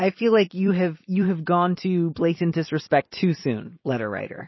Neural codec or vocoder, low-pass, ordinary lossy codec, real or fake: vocoder, 44.1 kHz, 128 mel bands every 256 samples, BigVGAN v2; 7.2 kHz; MP3, 24 kbps; fake